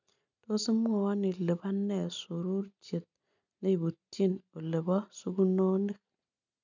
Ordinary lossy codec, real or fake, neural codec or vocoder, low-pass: none; real; none; 7.2 kHz